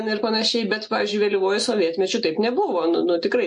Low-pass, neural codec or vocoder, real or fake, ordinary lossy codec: 10.8 kHz; none; real; MP3, 48 kbps